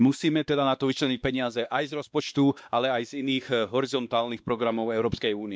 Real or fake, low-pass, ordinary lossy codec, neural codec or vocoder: fake; none; none; codec, 16 kHz, 2 kbps, X-Codec, WavLM features, trained on Multilingual LibriSpeech